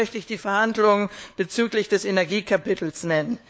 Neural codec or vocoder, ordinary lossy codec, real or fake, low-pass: codec, 16 kHz, 4 kbps, FunCodec, trained on LibriTTS, 50 frames a second; none; fake; none